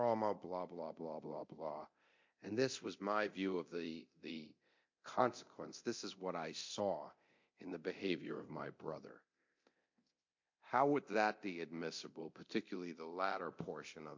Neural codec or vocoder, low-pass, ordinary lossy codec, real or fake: codec, 24 kHz, 0.9 kbps, DualCodec; 7.2 kHz; MP3, 48 kbps; fake